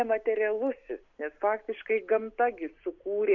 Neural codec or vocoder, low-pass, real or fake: none; 7.2 kHz; real